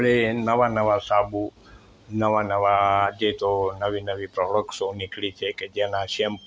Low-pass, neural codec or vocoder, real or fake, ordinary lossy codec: none; none; real; none